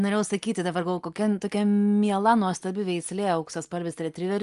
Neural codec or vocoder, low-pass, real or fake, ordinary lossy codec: none; 10.8 kHz; real; Opus, 32 kbps